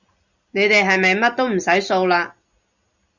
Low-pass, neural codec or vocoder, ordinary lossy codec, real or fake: 7.2 kHz; none; Opus, 64 kbps; real